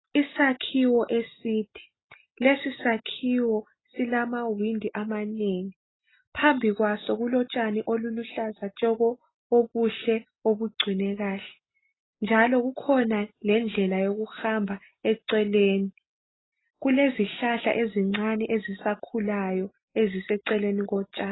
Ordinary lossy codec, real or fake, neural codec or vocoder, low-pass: AAC, 16 kbps; real; none; 7.2 kHz